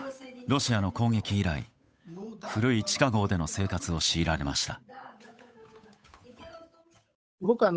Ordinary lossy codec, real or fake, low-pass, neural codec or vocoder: none; fake; none; codec, 16 kHz, 8 kbps, FunCodec, trained on Chinese and English, 25 frames a second